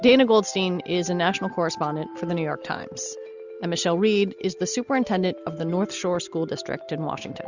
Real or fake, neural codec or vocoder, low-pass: real; none; 7.2 kHz